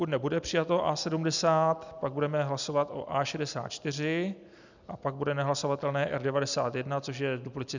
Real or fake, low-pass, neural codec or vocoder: real; 7.2 kHz; none